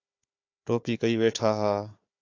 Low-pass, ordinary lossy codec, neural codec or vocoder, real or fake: 7.2 kHz; AAC, 48 kbps; codec, 16 kHz, 4 kbps, FunCodec, trained on Chinese and English, 50 frames a second; fake